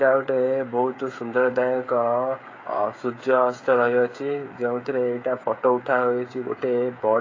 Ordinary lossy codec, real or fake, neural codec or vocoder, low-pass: AAC, 32 kbps; fake; codec, 16 kHz, 16 kbps, FreqCodec, smaller model; 7.2 kHz